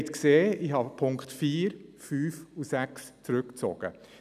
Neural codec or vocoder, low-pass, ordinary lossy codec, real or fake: none; 14.4 kHz; none; real